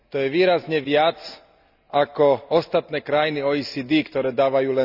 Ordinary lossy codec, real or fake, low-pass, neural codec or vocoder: none; real; 5.4 kHz; none